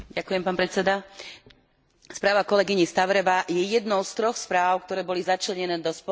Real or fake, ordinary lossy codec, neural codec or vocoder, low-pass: real; none; none; none